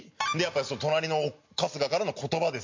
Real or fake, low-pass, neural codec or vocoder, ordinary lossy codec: real; 7.2 kHz; none; MP3, 48 kbps